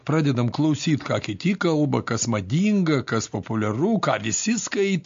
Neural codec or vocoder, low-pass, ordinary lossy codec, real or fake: none; 7.2 kHz; MP3, 48 kbps; real